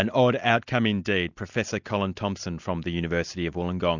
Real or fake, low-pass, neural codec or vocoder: real; 7.2 kHz; none